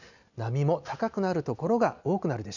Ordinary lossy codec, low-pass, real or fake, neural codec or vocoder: none; 7.2 kHz; real; none